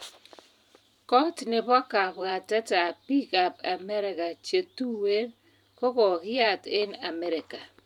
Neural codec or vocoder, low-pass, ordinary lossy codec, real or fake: vocoder, 44.1 kHz, 128 mel bands every 512 samples, BigVGAN v2; 19.8 kHz; none; fake